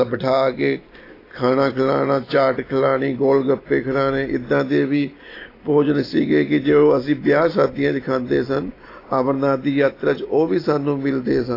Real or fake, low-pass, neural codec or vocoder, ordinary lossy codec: real; 5.4 kHz; none; AAC, 24 kbps